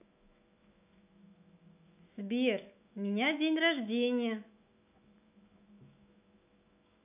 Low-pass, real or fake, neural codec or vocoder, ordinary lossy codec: 3.6 kHz; fake; autoencoder, 48 kHz, 128 numbers a frame, DAC-VAE, trained on Japanese speech; none